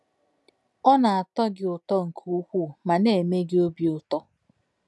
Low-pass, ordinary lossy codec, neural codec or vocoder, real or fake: none; none; none; real